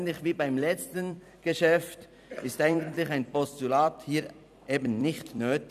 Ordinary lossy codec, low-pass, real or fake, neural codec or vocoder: none; 14.4 kHz; fake; vocoder, 44.1 kHz, 128 mel bands every 256 samples, BigVGAN v2